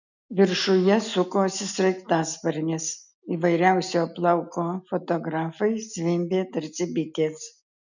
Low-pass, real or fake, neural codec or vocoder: 7.2 kHz; real; none